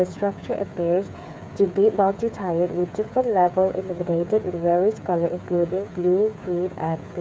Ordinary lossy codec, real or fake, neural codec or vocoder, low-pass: none; fake; codec, 16 kHz, 8 kbps, FreqCodec, smaller model; none